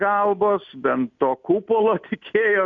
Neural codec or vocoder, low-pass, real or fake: none; 7.2 kHz; real